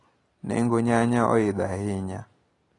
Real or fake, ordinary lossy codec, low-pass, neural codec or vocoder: real; AAC, 32 kbps; 10.8 kHz; none